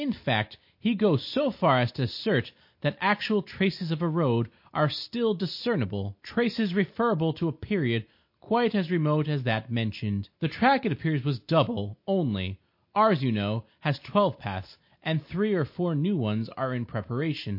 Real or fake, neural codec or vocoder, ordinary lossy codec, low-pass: real; none; MP3, 32 kbps; 5.4 kHz